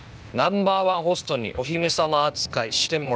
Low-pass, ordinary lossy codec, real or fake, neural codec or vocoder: none; none; fake; codec, 16 kHz, 0.8 kbps, ZipCodec